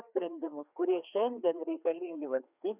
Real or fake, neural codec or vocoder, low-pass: fake; codec, 16 kHz, 2 kbps, FreqCodec, larger model; 3.6 kHz